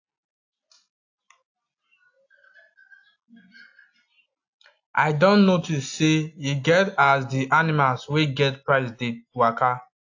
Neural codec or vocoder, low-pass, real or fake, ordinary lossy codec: none; 7.2 kHz; real; AAC, 48 kbps